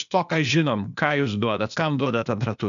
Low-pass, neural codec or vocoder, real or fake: 7.2 kHz; codec, 16 kHz, 0.8 kbps, ZipCodec; fake